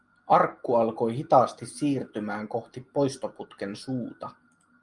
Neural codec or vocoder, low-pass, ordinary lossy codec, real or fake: none; 10.8 kHz; Opus, 32 kbps; real